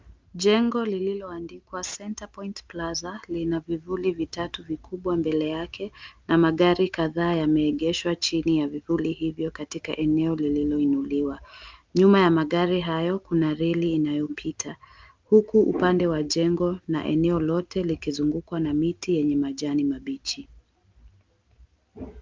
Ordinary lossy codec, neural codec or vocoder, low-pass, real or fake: Opus, 24 kbps; none; 7.2 kHz; real